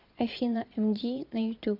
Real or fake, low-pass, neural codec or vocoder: fake; 5.4 kHz; vocoder, 22.05 kHz, 80 mel bands, Vocos